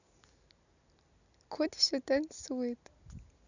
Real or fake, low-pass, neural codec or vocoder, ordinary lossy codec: real; 7.2 kHz; none; none